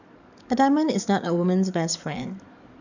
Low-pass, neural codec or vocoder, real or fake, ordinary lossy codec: 7.2 kHz; codec, 44.1 kHz, 7.8 kbps, DAC; fake; none